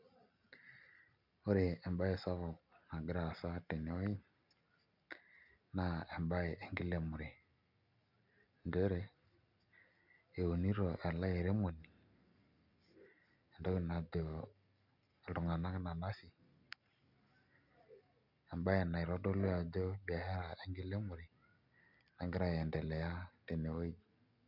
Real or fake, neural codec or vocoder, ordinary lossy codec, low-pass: real; none; none; 5.4 kHz